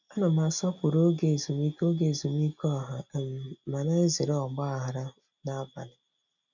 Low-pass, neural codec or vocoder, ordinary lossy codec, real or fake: 7.2 kHz; none; none; real